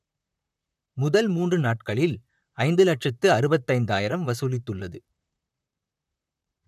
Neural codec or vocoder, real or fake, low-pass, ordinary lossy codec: vocoder, 44.1 kHz, 128 mel bands, Pupu-Vocoder; fake; 14.4 kHz; none